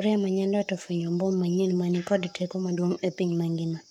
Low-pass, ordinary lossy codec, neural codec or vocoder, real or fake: 19.8 kHz; none; codec, 44.1 kHz, 7.8 kbps, Pupu-Codec; fake